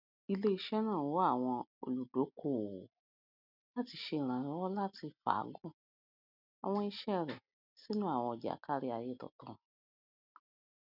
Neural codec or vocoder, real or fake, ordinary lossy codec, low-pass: none; real; none; 5.4 kHz